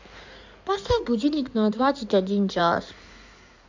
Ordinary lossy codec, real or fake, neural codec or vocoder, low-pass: MP3, 64 kbps; fake; codec, 16 kHz in and 24 kHz out, 2.2 kbps, FireRedTTS-2 codec; 7.2 kHz